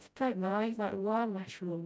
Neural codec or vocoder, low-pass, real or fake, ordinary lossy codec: codec, 16 kHz, 0.5 kbps, FreqCodec, smaller model; none; fake; none